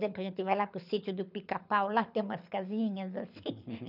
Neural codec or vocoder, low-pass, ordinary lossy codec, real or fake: codec, 24 kHz, 6 kbps, HILCodec; 5.4 kHz; none; fake